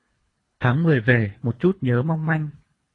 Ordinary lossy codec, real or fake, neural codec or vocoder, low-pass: AAC, 32 kbps; fake; codec, 24 kHz, 3 kbps, HILCodec; 10.8 kHz